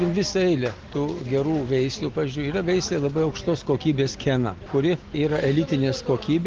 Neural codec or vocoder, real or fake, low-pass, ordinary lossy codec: none; real; 7.2 kHz; Opus, 16 kbps